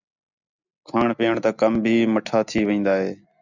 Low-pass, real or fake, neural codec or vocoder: 7.2 kHz; real; none